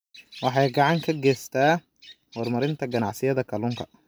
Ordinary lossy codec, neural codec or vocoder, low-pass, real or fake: none; none; none; real